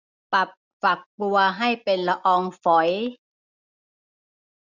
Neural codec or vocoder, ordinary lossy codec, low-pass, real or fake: none; none; 7.2 kHz; real